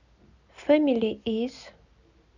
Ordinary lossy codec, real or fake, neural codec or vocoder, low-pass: none; real; none; 7.2 kHz